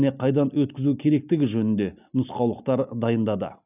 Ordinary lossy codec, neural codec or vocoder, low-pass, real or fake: none; none; 3.6 kHz; real